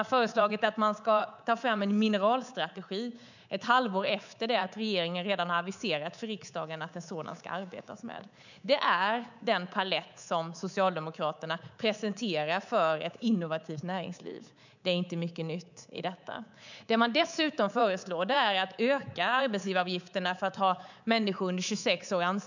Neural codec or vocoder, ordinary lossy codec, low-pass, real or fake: codec, 24 kHz, 3.1 kbps, DualCodec; none; 7.2 kHz; fake